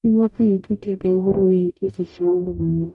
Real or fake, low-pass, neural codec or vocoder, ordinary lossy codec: fake; 10.8 kHz; codec, 44.1 kHz, 0.9 kbps, DAC; none